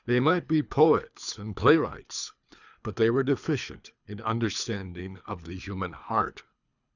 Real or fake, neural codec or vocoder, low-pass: fake; codec, 24 kHz, 3 kbps, HILCodec; 7.2 kHz